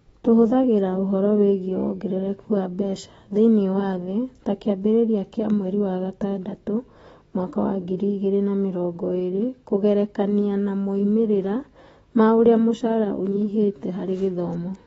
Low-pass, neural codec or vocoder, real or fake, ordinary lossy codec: 19.8 kHz; autoencoder, 48 kHz, 128 numbers a frame, DAC-VAE, trained on Japanese speech; fake; AAC, 24 kbps